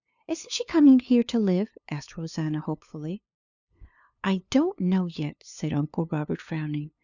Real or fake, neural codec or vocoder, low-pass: fake; codec, 16 kHz, 2 kbps, FunCodec, trained on LibriTTS, 25 frames a second; 7.2 kHz